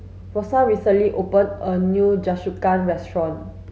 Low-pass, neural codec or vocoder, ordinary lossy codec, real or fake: none; none; none; real